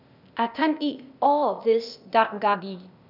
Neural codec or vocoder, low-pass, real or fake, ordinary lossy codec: codec, 16 kHz, 0.8 kbps, ZipCodec; 5.4 kHz; fake; none